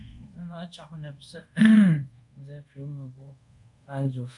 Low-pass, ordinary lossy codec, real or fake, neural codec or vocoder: 10.8 kHz; MP3, 64 kbps; fake; codec, 24 kHz, 0.5 kbps, DualCodec